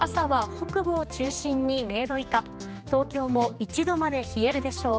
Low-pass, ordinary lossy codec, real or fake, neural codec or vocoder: none; none; fake; codec, 16 kHz, 2 kbps, X-Codec, HuBERT features, trained on general audio